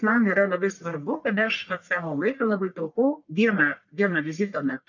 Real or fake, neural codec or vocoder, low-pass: fake; codec, 44.1 kHz, 1.7 kbps, Pupu-Codec; 7.2 kHz